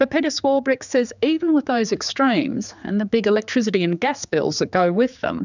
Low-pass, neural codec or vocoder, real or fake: 7.2 kHz; codec, 16 kHz, 4 kbps, X-Codec, HuBERT features, trained on general audio; fake